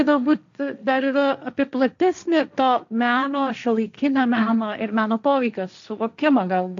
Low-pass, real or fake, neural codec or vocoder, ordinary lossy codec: 7.2 kHz; fake; codec, 16 kHz, 1.1 kbps, Voila-Tokenizer; AAC, 64 kbps